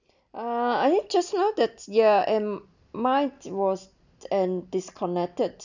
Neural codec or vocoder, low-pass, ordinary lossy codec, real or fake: none; 7.2 kHz; none; real